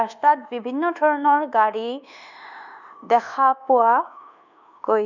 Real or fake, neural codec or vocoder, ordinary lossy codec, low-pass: fake; codec, 24 kHz, 1.2 kbps, DualCodec; none; 7.2 kHz